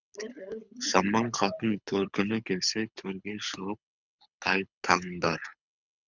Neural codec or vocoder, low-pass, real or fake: codec, 24 kHz, 6 kbps, HILCodec; 7.2 kHz; fake